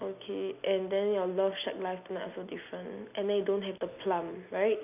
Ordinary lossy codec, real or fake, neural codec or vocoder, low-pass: none; real; none; 3.6 kHz